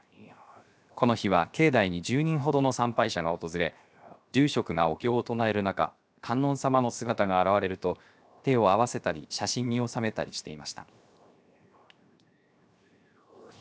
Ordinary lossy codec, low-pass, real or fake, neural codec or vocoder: none; none; fake; codec, 16 kHz, 0.7 kbps, FocalCodec